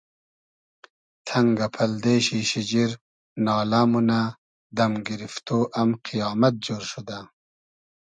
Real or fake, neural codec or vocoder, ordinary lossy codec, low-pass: real; none; MP3, 96 kbps; 9.9 kHz